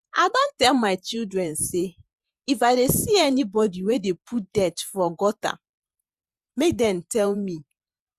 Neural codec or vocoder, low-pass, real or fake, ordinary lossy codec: vocoder, 48 kHz, 128 mel bands, Vocos; 14.4 kHz; fake; Opus, 64 kbps